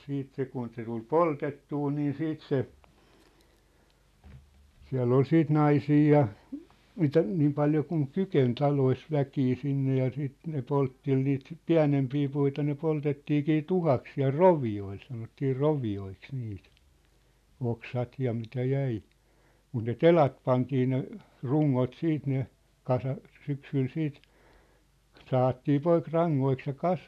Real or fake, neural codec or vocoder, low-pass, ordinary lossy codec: real; none; 14.4 kHz; none